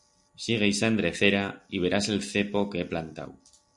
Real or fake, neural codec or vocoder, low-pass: real; none; 10.8 kHz